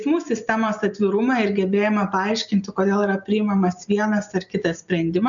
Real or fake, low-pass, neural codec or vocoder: real; 7.2 kHz; none